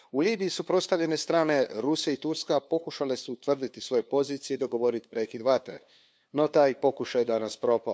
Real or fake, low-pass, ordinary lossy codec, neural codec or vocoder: fake; none; none; codec, 16 kHz, 2 kbps, FunCodec, trained on LibriTTS, 25 frames a second